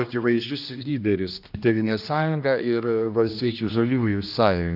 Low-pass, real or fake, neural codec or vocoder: 5.4 kHz; fake; codec, 16 kHz, 1 kbps, X-Codec, HuBERT features, trained on general audio